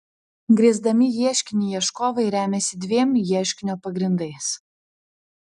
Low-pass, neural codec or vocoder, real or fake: 10.8 kHz; none; real